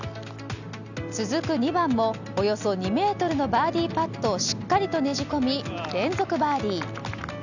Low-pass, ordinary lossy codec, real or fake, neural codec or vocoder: 7.2 kHz; none; real; none